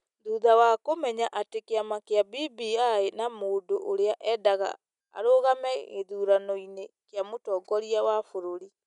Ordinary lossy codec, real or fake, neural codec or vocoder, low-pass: none; real; none; 10.8 kHz